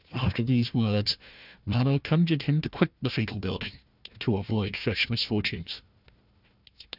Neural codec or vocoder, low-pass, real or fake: codec, 16 kHz, 1 kbps, FunCodec, trained on Chinese and English, 50 frames a second; 5.4 kHz; fake